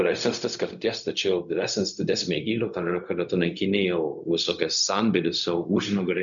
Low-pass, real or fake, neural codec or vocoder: 7.2 kHz; fake; codec, 16 kHz, 0.4 kbps, LongCat-Audio-Codec